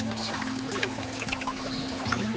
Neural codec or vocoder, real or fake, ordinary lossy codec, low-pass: codec, 16 kHz, 4 kbps, X-Codec, HuBERT features, trained on general audio; fake; none; none